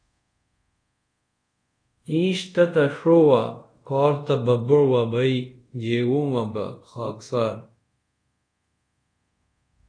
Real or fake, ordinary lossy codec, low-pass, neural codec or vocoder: fake; AAC, 48 kbps; 9.9 kHz; codec, 24 kHz, 0.5 kbps, DualCodec